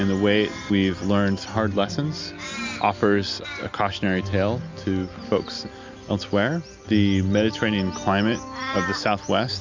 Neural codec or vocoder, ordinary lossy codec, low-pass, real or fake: none; MP3, 64 kbps; 7.2 kHz; real